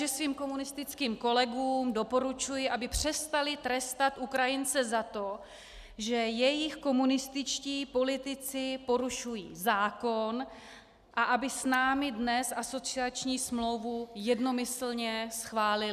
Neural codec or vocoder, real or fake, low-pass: none; real; 14.4 kHz